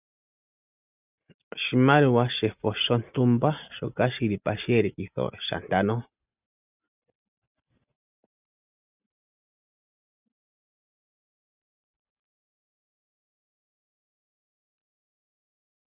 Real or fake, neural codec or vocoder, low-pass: real; none; 3.6 kHz